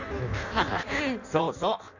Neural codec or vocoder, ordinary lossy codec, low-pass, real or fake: codec, 16 kHz in and 24 kHz out, 0.6 kbps, FireRedTTS-2 codec; none; 7.2 kHz; fake